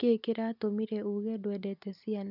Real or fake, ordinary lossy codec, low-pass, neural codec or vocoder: real; none; 5.4 kHz; none